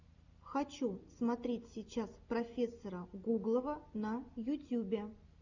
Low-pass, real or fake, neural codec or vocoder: 7.2 kHz; real; none